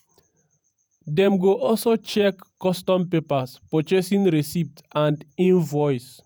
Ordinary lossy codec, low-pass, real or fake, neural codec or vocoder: none; none; fake; vocoder, 48 kHz, 128 mel bands, Vocos